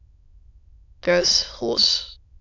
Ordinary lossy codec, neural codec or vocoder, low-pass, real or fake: MP3, 64 kbps; autoencoder, 22.05 kHz, a latent of 192 numbers a frame, VITS, trained on many speakers; 7.2 kHz; fake